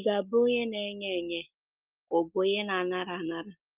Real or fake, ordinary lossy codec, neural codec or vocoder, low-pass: real; Opus, 24 kbps; none; 3.6 kHz